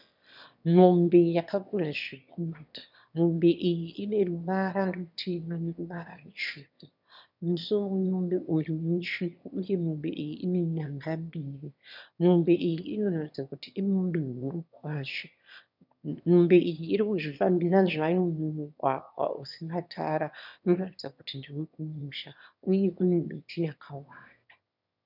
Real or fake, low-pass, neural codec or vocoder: fake; 5.4 kHz; autoencoder, 22.05 kHz, a latent of 192 numbers a frame, VITS, trained on one speaker